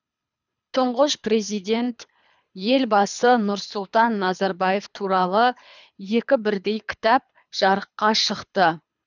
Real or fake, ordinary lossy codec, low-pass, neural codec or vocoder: fake; none; 7.2 kHz; codec, 24 kHz, 3 kbps, HILCodec